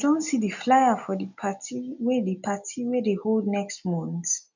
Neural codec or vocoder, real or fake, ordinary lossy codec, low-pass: none; real; none; 7.2 kHz